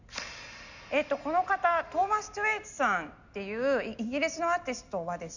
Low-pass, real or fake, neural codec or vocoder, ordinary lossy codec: 7.2 kHz; real; none; none